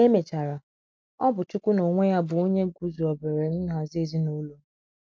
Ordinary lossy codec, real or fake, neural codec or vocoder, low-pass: none; real; none; none